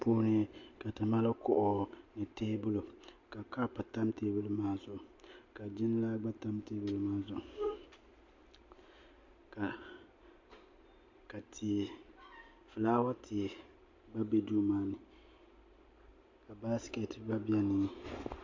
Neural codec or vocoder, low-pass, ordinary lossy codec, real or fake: none; 7.2 kHz; MP3, 48 kbps; real